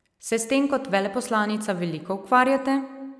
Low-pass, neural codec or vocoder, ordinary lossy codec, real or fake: none; none; none; real